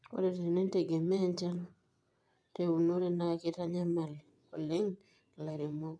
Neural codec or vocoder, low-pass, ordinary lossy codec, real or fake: vocoder, 22.05 kHz, 80 mel bands, WaveNeXt; none; none; fake